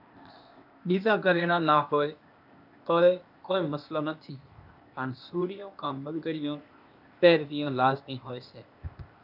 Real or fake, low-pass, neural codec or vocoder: fake; 5.4 kHz; codec, 16 kHz, 0.8 kbps, ZipCodec